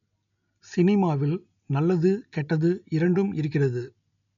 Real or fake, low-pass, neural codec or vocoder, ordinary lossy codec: real; 7.2 kHz; none; none